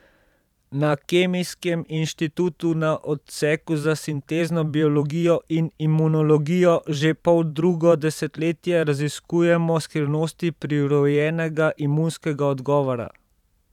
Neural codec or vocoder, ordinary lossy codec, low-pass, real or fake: vocoder, 44.1 kHz, 128 mel bands every 512 samples, BigVGAN v2; none; 19.8 kHz; fake